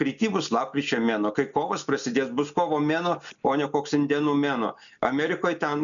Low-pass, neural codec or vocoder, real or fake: 7.2 kHz; none; real